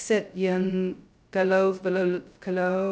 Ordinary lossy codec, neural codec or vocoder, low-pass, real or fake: none; codec, 16 kHz, 0.2 kbps, FocalCodec; none; fake